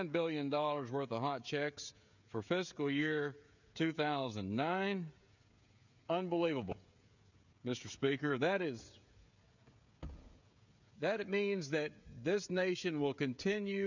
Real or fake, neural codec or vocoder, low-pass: fake; codec, 16 kHz, 16 kbps, FreqCodec, smaller model; 7.2 kHz